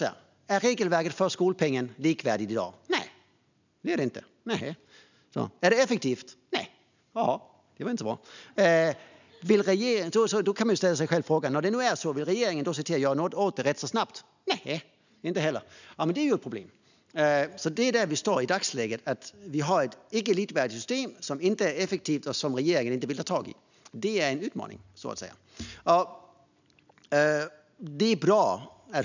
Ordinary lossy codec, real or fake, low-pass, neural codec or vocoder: none; real; 7.2 kHz; none